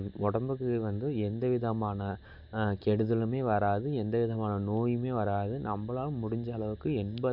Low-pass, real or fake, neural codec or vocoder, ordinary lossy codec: 5.4 kHz; real; none; none